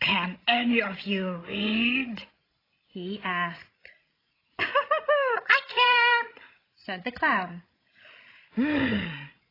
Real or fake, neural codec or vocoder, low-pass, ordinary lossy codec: fake; codec, 16 kHz, 16 kbps, FreqCodec, larger model; 5.4 kHz; AAC, 24 kbps